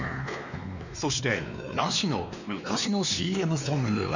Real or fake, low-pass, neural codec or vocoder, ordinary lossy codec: fake; 7.2 kHz; codec, 16 kHz, 2 kbps, X-Codec, WavLM features, trained on Multilingual LibriSpeech; none